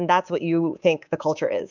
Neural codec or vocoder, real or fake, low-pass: codec, 24 kHz, 3.1 kbps, DualCodec; fake; 7.2 kHz